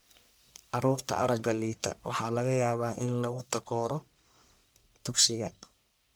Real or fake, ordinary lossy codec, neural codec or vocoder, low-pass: fake; none; codec, 44.1 kHz, 1.7 kbps, Pupu-Codec; none